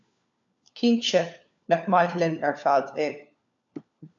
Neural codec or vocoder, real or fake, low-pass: codec, 16 kHz, 4 kbps, FunCodec, trained on LibriTTS, 50 frames a second; fake; 7.2 kHz